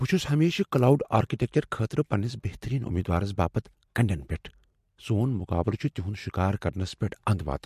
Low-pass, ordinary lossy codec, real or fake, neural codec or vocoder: 14.4 kHz; MP3, 64 kbps; real; none